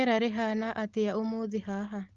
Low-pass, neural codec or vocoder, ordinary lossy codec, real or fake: 7.2 kHz; none; Opus, 16 kbps; real